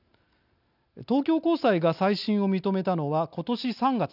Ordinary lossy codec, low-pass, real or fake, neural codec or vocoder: none; 5.4 kHz; real; none